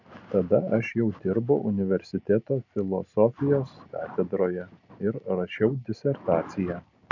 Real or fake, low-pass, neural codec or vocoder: real; 7.2 kHz; none